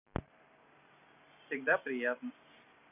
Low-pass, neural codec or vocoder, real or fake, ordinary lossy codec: 3.6 kHz; none; real; none